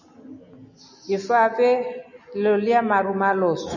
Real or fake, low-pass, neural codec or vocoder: real; 7.2 kHz; none